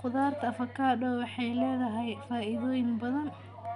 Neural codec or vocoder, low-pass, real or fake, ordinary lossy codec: none; 10.8 kHz; real; none